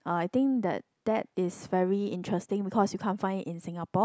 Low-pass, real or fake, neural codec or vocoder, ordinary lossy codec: none; real; none; none